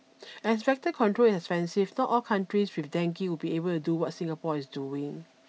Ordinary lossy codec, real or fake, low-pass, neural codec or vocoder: none; real; none; none